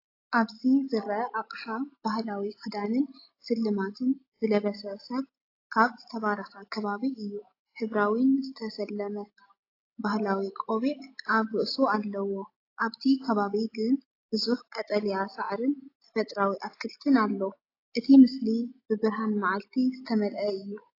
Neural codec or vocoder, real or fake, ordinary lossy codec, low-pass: none; real; AAC, 32 kbps; 5.4 kHz